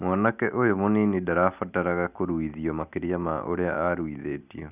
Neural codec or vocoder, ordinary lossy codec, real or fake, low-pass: none; none; real; 3.6 kHz